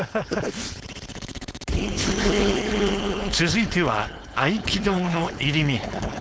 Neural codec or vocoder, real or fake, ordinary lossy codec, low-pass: codec, 16 kHz, 4.8 kbps, FACodec; fake; none; none